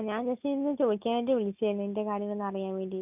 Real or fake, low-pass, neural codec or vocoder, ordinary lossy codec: real; 3.6 kHz; none; none